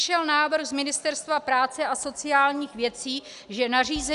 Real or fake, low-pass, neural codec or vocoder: real; 10.8 kHz; none